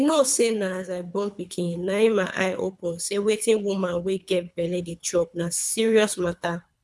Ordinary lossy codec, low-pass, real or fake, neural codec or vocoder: none; none; fake; codec, 24 kHz, 3 kbps, HILCodec